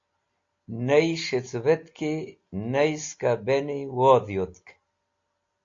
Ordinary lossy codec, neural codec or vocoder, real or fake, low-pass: AAC, 48 kbps; none; real; 7.2 kHz